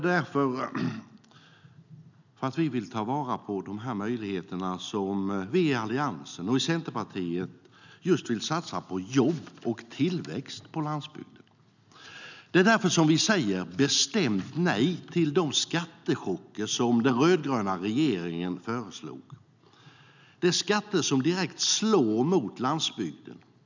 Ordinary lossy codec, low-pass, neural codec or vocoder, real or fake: none; 7.2 kHz; none; real